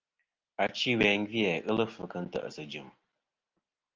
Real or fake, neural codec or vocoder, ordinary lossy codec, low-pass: real; none; Opus, 24 kbps; 7.2 kHz